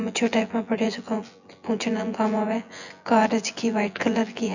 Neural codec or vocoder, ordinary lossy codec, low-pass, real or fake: vocoder, 24 kHz, 100 mel bands, Vocos; none; 7.2 kHz; fake